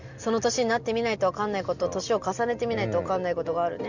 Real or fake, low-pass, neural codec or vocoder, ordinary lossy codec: real; 7.2 kHz; none; none